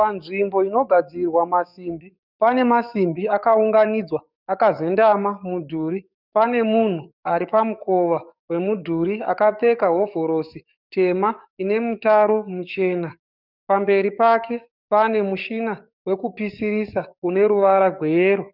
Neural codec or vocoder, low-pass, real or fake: codec, 44.1 kHz, 7.8 kbps, DAC; 5.4 kHz; fake